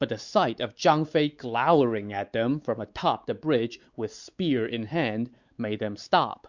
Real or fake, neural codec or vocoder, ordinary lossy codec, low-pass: fake; codec, 16 kHz, 4 kbps, X-Codec, WavLM features, trained on Multilingual LibriSpeech; Opus, 64 kbps; 7.2 kHz